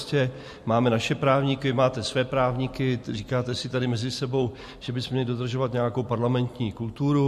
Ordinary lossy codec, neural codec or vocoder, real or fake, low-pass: MP3, 64 kbps; none; real; 14.4 kHz